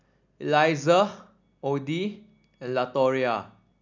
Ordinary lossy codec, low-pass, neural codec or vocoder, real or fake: none; 7.2 kHz; none; real